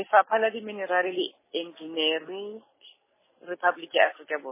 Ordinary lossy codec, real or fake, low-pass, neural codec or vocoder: MP3, 16 kbps; real; 3.6 kHz; none